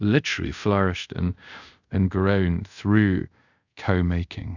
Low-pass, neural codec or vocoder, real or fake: 7.2 kHz; codec, 24 kHz, 0.5 kbps, DualCodec; fake